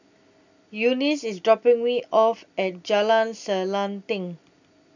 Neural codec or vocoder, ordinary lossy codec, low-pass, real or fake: none; none; 7.2 kHz; real